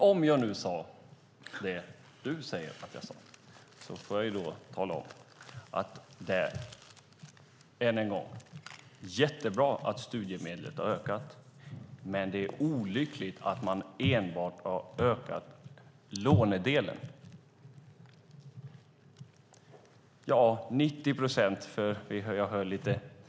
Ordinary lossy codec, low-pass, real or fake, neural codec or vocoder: none; none; real; none